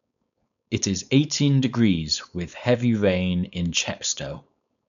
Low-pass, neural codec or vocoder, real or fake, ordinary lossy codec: 7.2 kHz; codec, 16 kHz, 4.8 kbps, FACodec; fake; none